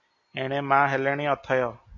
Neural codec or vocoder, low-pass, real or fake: none; 7.2 kHz; real